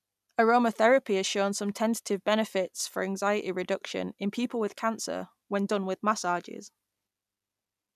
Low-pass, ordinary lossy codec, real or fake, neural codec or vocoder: 14.4 kHz; none; real; none